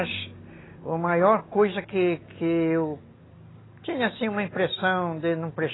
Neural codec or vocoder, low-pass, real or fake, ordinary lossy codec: none; 7.2 kHz; real; AAC, 16 kbps